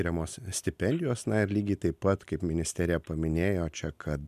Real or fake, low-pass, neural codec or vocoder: real; 14.4 kHz; none